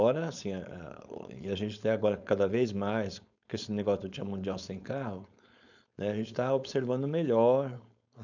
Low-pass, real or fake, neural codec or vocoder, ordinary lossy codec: 7.2 kHz; fake; codec, 16 kHz, 4.8 kbps, FACodec; none